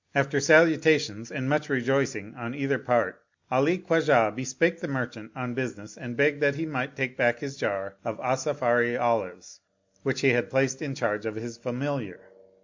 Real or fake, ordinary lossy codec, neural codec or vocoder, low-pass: real; MP3, 64 kbps; none; 7.2 kHz